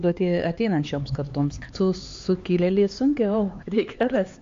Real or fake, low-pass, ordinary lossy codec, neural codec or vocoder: fake; 7.2 kHz; AAC, 48 kbps; codec, 16 kHz, 4 kbps, X-Codec, HuBERT features, trained on LibriSpeech